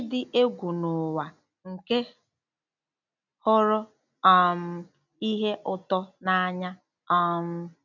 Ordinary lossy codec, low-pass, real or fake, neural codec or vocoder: none; 7.2 kHz; real; none